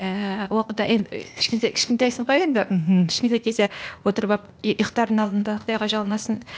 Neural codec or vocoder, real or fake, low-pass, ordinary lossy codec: codec, 16 kHz, 0.8 kbps, ZipCodec; fake; none; none